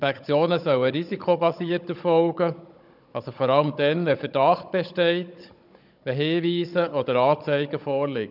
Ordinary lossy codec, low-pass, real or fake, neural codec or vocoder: none; 5.4 kHz; fake; codec, 16 kHz, 16 kbps, FunCodec, trained on Chinese and English, 50 frames a second